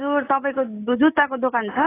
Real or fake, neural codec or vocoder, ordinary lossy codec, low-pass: real; none; AAC, 16 kbps; 3.6 kHz